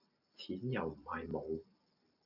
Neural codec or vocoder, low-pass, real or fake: none; 5.4 kHz; real